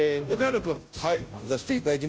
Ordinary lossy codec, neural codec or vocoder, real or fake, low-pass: none; codec, 16 kHz, 0.5 kbps, FunCodec, trained on Chinese and English, 25 frames a second; fake; none